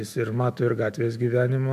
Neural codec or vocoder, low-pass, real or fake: autoencoder, 48 kHz, 128 numbers a frame, DAC-VAE, trained on Japanese speech; 14.4 kHz; fake